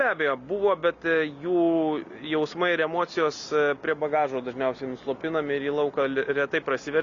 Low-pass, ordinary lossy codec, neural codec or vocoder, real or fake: 7.2 kHz; Opus, 64 kbps; none; real